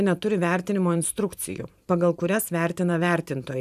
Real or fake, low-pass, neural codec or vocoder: fake; 14.4 kHz; vocoder, 44.1 kHz, 128 mel bands every 512 samples, BigVGAN v2